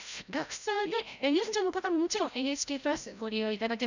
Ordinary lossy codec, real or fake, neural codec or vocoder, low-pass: none; fake; codec, 16 kHz, 0.5 kbps, FreqCodec, larger model; 7.2 kHz